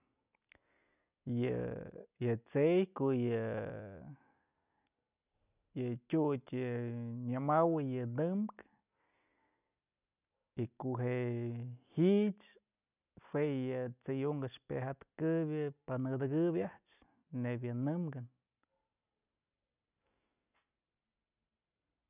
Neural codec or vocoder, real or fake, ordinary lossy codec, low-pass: none; real; none; 3.6 kHz